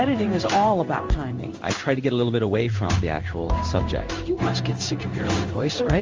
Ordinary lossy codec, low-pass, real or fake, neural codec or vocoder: Opus, 32 kbps; 7.2 kHz; fake; codec, 16 kHz in and 24 kHz out, 1 kbps, XY-Tokenizer